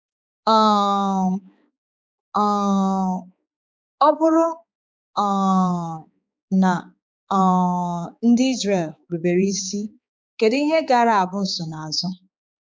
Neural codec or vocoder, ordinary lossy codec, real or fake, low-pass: codec, 16 kHz, 4 kbps, X-Codec, HuBERT features, trained on balanced general audio; none; fake; none